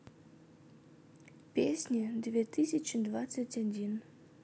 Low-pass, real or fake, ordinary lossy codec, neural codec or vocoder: none; real; none; none